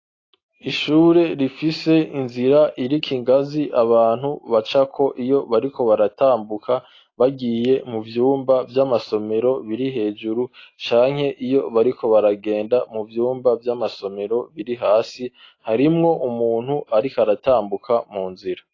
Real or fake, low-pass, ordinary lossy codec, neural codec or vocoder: real; 7.2 kHz; AAC, 32 kbps; none